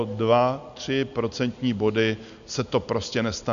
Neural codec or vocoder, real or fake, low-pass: none; real; 7.2 kHz